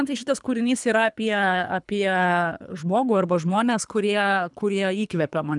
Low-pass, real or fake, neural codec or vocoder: 10.8 kHz; fake; codec, 24 kHz, 3 kbps, HILCodec